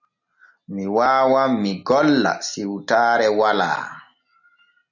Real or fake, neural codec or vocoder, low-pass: real; none; 7.2 kHz